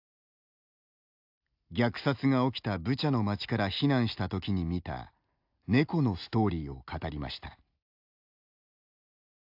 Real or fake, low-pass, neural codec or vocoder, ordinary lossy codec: real; 5.4 kHz; none; AAC, 48 kbps